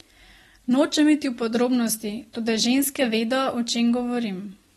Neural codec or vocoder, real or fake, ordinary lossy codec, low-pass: none; real; AAC, 32 kbps; 19.8 kHz